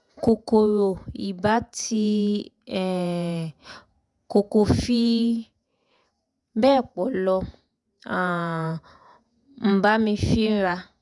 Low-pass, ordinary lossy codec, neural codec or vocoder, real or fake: 10.8 kHz; none; vocoder, 48 kHz, 128 mel bands, Vocos; fake